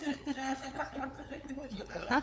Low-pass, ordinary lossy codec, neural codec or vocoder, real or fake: none; none; codec, 16 kHz, 8 kbps, FunCodec, trained on LibriTTS, 25 frames a second; fake